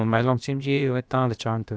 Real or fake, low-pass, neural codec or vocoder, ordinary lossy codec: fake; none; codec, 16 kHz, about 1 kbps, DyCAST, with the encoder's durations; none